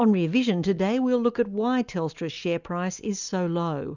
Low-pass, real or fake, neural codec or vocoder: 7.2 kHz; real; none